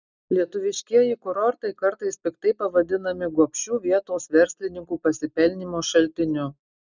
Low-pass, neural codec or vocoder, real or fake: 7.2 kHz; none; real